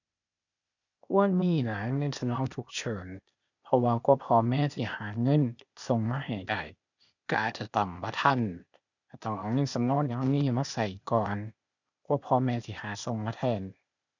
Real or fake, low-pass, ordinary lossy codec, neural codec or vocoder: fake; 7.2 kHz; none; codec, 16 kHz, 0.8 kbps, ZipCodec